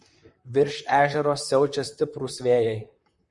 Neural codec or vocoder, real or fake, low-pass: vocoder, 44.1 kHz, 128 mel bands, Pupu-Vocoder; fake; 10.8 kHz